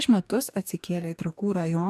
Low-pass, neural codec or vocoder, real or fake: 14.4 kHz; codec, 44.1 kHz, 2.6 kbps, DAC; fake